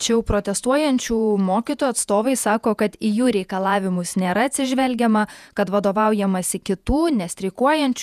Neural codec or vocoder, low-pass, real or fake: vocoder, 48 kHz, 128 mel bands, Vocos; 14.4 kHz; fake